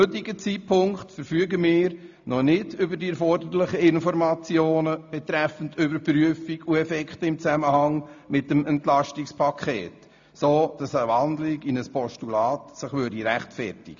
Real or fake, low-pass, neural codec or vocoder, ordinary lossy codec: real; 7.2 kHz; none; AAC, 64 kbps